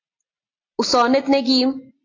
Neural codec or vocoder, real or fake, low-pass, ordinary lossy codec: none; real; 7.2 kHz; AAC, 32 kbps